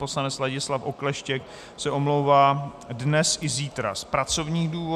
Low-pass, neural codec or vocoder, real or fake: 14.4 kHz; none; real